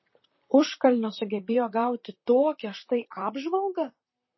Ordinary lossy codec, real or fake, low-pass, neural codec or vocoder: MP3, 24 kbps; fake; 7.2 kHz; vocoder, 44.1 kHz, 128 mel bands, Pupu-Vocoder